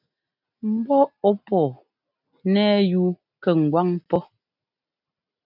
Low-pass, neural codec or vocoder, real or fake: 5.4 kHz; none; real